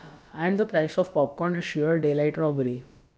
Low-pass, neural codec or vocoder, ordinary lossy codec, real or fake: none; codec, 16 kHz, about 1 kbps, DyCAST, with the encoder's durations; none; fake